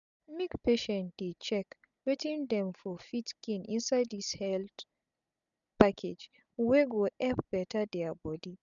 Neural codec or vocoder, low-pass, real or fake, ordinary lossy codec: none; 7.2 kHz; real; none